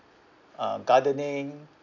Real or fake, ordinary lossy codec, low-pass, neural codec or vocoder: real; none; 7.2 kHz; none